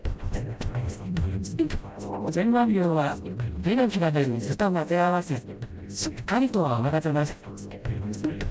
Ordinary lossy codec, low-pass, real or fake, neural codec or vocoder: none; none; fake; codec, 16 kHz, 0.5 kbps, FreqCodec, smaller model